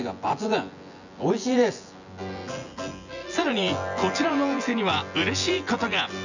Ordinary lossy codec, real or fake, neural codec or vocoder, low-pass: none; fake; vocoder, 24 kHz, 100 mel bands, Vocos; 7.2 kHz